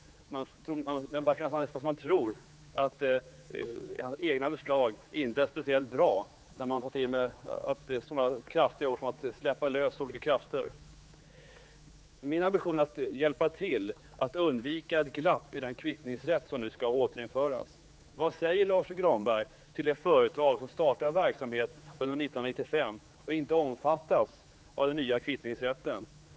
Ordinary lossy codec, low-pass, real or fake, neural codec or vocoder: none; none; fake; codec, 16 kHz, 4 kbps, X-Codec, HuBERT features, trained on general audio